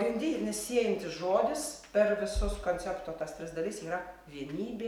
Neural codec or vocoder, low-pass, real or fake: none; 19.8 kHz; real